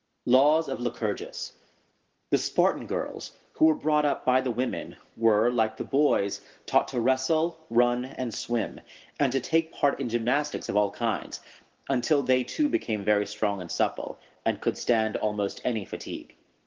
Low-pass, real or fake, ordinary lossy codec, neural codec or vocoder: 7.2 kHz; real; Opus, 16 kbps; none